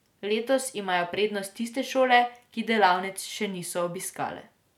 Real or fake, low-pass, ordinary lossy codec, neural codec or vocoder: real; 19.8 kHz; none; none